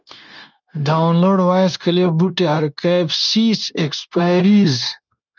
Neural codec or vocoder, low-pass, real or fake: codec, 16 kHz, 0.9 kbps, LongCat-Audio-Codec; 7.2 kHz; fake